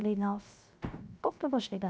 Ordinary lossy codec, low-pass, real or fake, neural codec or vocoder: none; none; fake; codec, 16 kHz, 0.7 kbps, FocalCodec